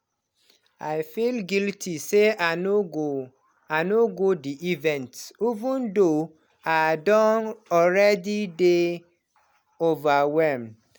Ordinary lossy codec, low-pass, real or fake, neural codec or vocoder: none; none; real; none